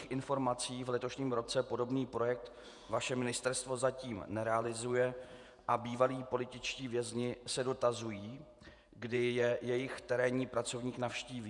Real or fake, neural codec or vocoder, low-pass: fake; vocoder, 48 kHz, 128 mel bands, Vocos; 10.8 kHz